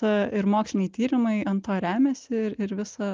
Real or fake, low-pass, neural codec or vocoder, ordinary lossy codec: real; 7.2 kHz; none; Opus, 32 kbps